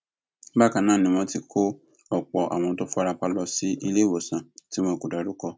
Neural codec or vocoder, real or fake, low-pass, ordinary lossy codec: none; real; none; none